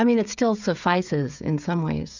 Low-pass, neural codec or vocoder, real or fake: 7.2 kHz; codec, 16 kHz, 16 kbps, FreqCodec, smaller model; fake